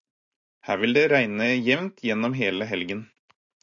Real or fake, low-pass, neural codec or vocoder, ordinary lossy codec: real; 7.2 kHz; none; MP3, 96 kbps